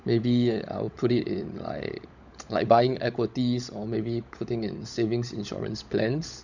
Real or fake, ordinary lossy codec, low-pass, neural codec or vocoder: fake; none; 7.2 kHz; codec, 16 kHz, 16 kbps, FunCodec, trained on LibriTTS, 50 frames a second